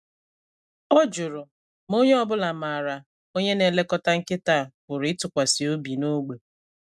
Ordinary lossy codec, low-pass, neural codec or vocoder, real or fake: none; none; none; real